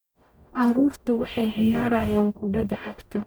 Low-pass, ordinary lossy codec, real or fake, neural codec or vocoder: none; none; fake; codec, 44.1 kHz, 0.9 kbps, DAC